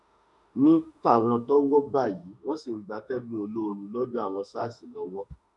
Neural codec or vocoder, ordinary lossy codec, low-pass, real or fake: autoencoder, 48 kHz, 32 numbers a frame, DAC-VAE, trained on Japanese speech; none; 10.8 kHz; fake